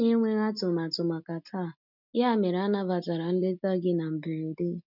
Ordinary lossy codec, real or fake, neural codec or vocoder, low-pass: none; real; none; 5.4 kHz